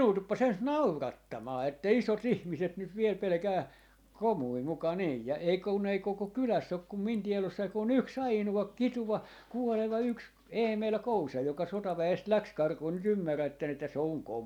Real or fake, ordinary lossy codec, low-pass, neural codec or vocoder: real; none; 19.8 kHz; none